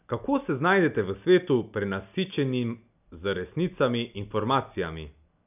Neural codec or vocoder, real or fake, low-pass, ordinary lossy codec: none; real; 3.6 kHz; none